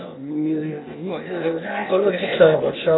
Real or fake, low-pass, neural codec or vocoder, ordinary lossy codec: fake; 7.2 kHz; codec, 16 kHz, 0.8 kbps, ZipCodec; AAC, 16 kbps